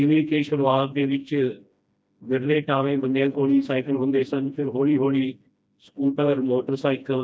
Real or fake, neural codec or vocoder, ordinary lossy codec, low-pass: fake; codec, 16 kHz, 1 kbps, FreqCodec, smaller model; none; none